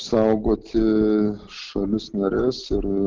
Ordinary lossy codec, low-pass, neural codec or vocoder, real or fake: Opus, 16 kbps; 7.2 kHz; none; real